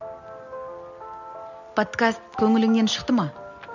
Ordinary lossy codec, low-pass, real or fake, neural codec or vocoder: none; 7.2 kHz; real; none